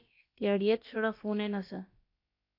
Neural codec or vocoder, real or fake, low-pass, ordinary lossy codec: codec, 16 kHz, about 1 kbps, DyCAST, with the encoder's durations; fake; 5.4 kHz; AAC, 32 kbps